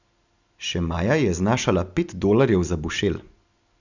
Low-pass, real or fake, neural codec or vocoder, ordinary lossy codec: 7.2 kHz; real; none; none